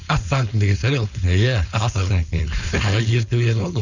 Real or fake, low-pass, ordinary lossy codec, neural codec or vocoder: fake; 7.2 kHz; none; codec, 16 kHz, 4.8 kbps, FACodec